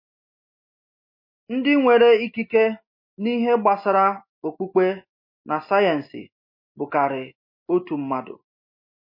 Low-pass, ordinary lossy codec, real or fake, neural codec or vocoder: 5.4 kHz; MP3, 32 kbps; real; none